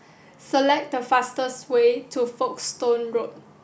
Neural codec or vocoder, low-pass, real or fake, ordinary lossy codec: none; none; real; none